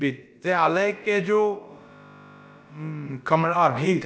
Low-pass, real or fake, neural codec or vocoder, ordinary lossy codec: none; fake; codec, 16 kHz, about 1 kbps, DyCAST, with the encoder's durations; none